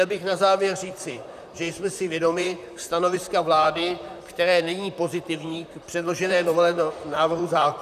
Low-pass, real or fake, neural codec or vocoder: 14.4 kHz; fake; vocoder, 44.1 kHz, 128 mel bands, Pupu-Vocoder